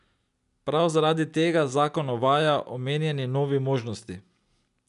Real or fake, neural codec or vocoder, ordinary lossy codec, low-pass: real; none; none; 10.8 kHz